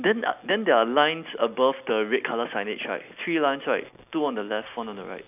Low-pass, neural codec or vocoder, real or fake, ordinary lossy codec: 3.6 kHz; none; real; none